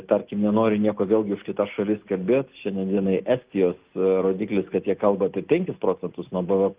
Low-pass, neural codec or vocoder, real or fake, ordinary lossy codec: 3.6 kHz; none; real; Opus, 32 kbps